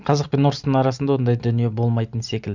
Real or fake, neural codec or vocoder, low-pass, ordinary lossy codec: real; none; 7.2 kHz; Opus, 64 kbps